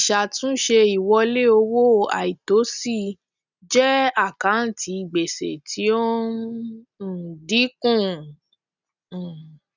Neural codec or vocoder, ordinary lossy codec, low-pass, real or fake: none; none; 7.2 kHz; real